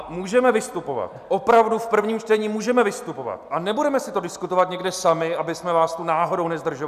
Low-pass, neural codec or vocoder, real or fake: 14.4 kHz; none; real